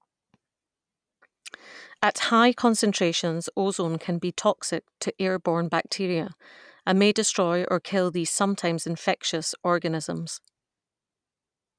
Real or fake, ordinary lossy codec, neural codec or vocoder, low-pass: real; none; none; 9.9 kHz